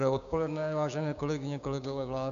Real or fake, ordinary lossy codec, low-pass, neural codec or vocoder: fake; AAC, 96 kbps; 7.2 kHz; codec, 16 kHz, 6 kbps, DAC